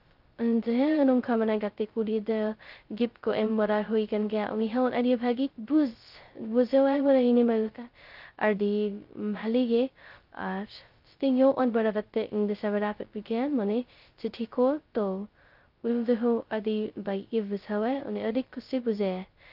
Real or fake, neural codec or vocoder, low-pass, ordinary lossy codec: fake; codec, 16 kHz, 0.2 kbps, FocalCodec; 5.4 kHz; Opus, 32 kbps